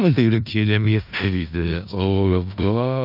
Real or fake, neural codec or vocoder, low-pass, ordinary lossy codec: fake; codec, 16 kHz in and 24 kHz out, 0.4 kbps, LongCat-Audio-Codec, four codebook decoder; 5.4 kHz; none